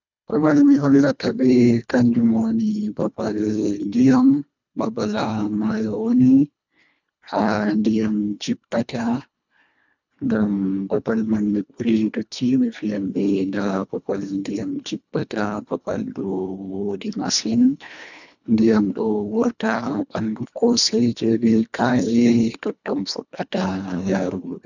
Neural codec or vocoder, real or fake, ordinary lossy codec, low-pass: codec, 24 kHz, 1.5 kbps, HILCodec; fake; none; 7.2 kHz